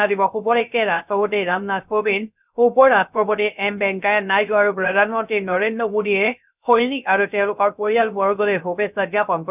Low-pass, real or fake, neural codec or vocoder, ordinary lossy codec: 3.6 kHz; fake; codec, 16 kHz, 0.3 kbps, FocalCodec; none